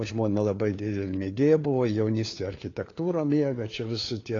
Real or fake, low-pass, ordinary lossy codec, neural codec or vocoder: fake; 7.2 kHz; AAC, 32 kbps; codec, 16 kHz, 4 kbps, FunCodec, trained on LibriTTS, 50 frames a second